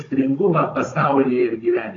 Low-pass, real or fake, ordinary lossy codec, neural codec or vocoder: 7.2 kHz; fake; AAC, 32 kbps; codec, 16 kHz, 16 kbps, FunCodec, trained on Chinese and English, 50 frames a second